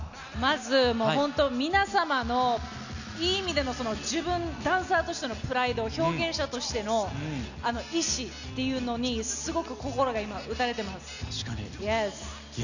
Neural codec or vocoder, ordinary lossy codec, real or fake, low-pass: none; none; real; 7.2 kHz